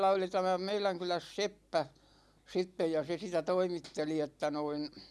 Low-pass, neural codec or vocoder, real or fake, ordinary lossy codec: none; none; real; none